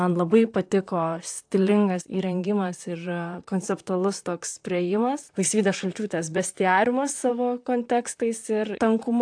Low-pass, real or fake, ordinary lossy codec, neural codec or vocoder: 9.9 kHz; fake; AAC, 64 kbps; vocoder, 44.1 kHz, 128 mel bands, Pupu-Vocoder